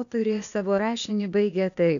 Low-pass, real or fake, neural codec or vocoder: 7.2 kHz; fake; codec, 16 kHz, 0.8 kbps, ZipCodec